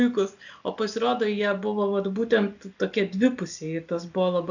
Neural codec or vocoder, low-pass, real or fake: none; 7.2 kHz; real